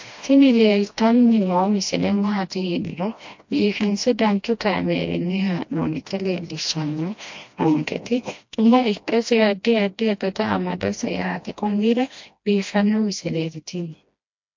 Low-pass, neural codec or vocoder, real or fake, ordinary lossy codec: 7.2 kHz; codec, 16 kHz, 1 kbps, FreqCodec, smaller model; fake; MP3, 48 kbps